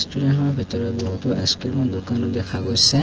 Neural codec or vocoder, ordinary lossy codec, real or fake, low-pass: vocoder, 24 kHz, 100 mel bands, Vocos; Opus, 16 kbps; fake; 7.2 kHz